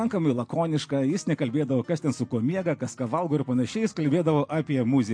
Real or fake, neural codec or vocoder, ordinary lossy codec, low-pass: real; none; MP3, 48 kbps; 9.9 kHz